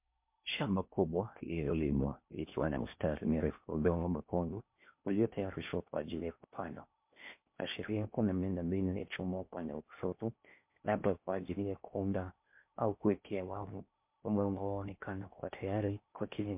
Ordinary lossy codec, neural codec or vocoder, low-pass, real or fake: MP3, 32 kbps; codec, 16 kHz in and 24 kHz out, 0.6 kbps, FocalCodec, streaming, 4096 codes; 3.6 kHz; fake